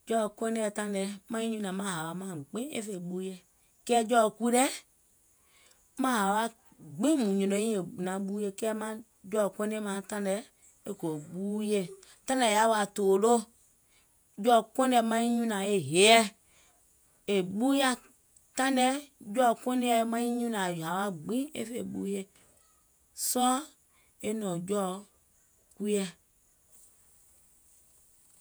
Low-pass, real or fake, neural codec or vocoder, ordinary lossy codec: none; fake; vocoder, 48 kHz, 128 mel bands, Vocos; none